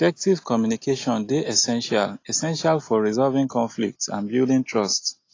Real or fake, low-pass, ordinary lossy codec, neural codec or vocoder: real; 7.2 kHz; AAC, 32 kbps; none